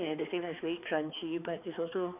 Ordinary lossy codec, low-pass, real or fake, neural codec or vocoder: none; 3.6 kHz; fake; codec, 16 kHz, 4 kbps, X-Codec, HuBERT features, trained on balanced general audio